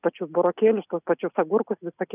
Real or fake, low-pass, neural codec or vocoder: real; 3.6 kHz; none